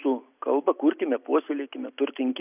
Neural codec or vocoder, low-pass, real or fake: none; 3.6 kHz; real